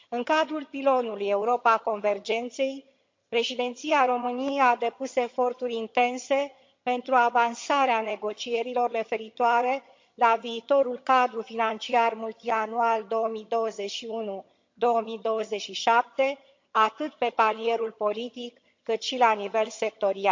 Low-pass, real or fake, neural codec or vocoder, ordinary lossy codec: 7.2 kHz; fake; vocoder, 22.05 kHz, 80 mel bands, HiFi-GAN; MP3, 48 kbps